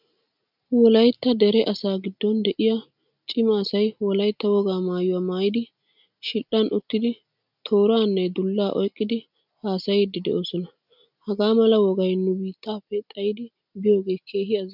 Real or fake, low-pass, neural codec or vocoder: real; 5.4 kHz; none